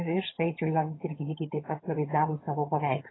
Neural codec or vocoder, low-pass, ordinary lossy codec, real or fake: vocoder, 22.05 kHz, 80 mel bands, HiFi-GAN; 7.2 kHz; AAC, 16 kbps; fake